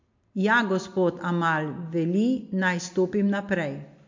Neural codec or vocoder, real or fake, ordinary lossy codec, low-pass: none; real; MP3, 48 kbps; 7.2 kHz